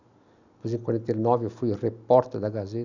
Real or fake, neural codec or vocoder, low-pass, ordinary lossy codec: real; none; 7.2 kHz; none